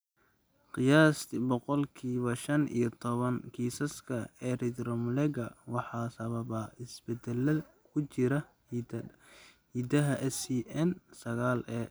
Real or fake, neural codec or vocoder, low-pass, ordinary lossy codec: real; none; none; none